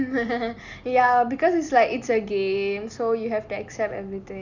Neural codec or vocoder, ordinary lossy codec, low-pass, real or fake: none; none; 7.2 kHz; real